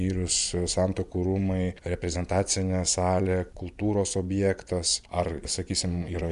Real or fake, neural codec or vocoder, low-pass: fake; vocoder, 24 kHz, 100 mel bands, Vocos; 10.8 kHz